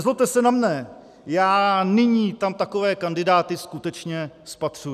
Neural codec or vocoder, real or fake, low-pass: none; real; 14.4 kHz